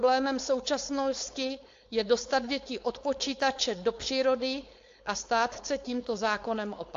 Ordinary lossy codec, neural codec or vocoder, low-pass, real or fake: AAC, 48 kbps; codec, 16 kHz, 4.8 kbps, FACodec; 7.2 kHz; fake